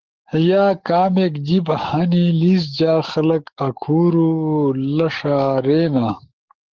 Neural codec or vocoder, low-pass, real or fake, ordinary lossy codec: codec, 44.1 kHz, 7.8 kbps, DAC; 7.2 kHz; fake; Opus, 16 kbps